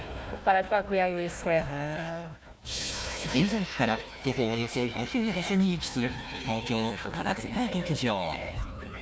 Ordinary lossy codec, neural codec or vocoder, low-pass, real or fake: none; codec, 16 kHz, 1 kbps, FunCodec, trained on Chinese and English, 50 frames a second; none; fake